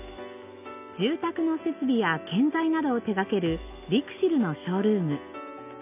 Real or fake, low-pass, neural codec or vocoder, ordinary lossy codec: real; 3.6 kHz; none; none